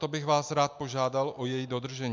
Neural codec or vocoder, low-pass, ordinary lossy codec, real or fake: none; 7.2 kHz; MP3, 64 kbps; real